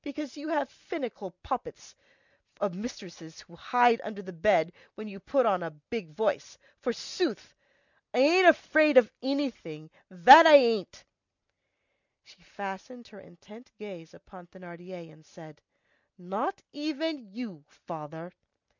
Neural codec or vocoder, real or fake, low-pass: none; real; 7.2 kHz